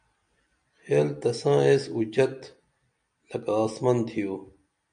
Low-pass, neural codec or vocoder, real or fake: 9.9 kHz; none; real